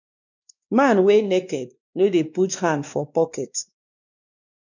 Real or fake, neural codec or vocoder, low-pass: fake; codec, 16 kHz, 2 kbps, X-Codec, WavLM features, trained on Multilingual LibriSpeech; 7.2 kHz